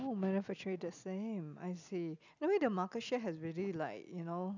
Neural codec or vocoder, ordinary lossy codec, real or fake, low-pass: none; none; real; 7.2 kHz